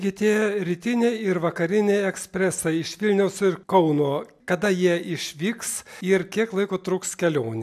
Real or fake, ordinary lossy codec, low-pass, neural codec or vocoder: real; AAC, 96 kbps; 14.4 kHz; none